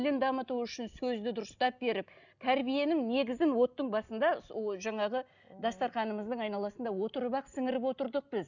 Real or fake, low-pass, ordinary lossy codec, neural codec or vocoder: real; 7.2 kHz; none; none